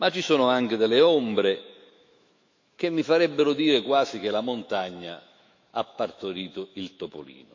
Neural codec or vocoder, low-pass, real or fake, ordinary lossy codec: autoencoder, 48 kHz, 128 numbers a frame, DAC-VAE, trained on Japanese speech; 7.2 kHz; fake; MP3, 64 kbps